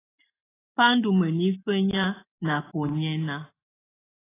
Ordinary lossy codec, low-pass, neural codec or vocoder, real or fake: AAC, 16 kbps; 3.6 kHz; none; real